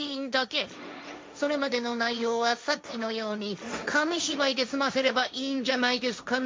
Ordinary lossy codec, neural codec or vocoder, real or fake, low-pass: none; codec, 16 kHz, 1.1 kbps, Voila-Tokenizer; fake; none